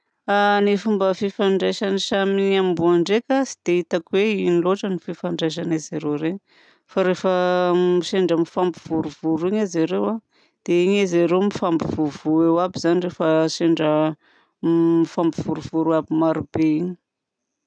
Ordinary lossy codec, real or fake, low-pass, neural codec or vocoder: none; real; 9.9 kHz; none